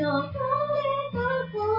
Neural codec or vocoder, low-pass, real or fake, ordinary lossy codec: none; 5.4 kHz; real; MP3, 32 kbps